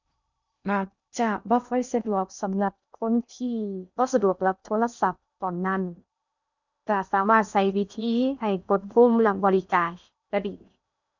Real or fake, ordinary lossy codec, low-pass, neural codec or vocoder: fake; none; 7.2 kHz; codec, 16 kHz in and 24 kHz out, 0.6 kbps, FocalCodec, streaming, 2048 codes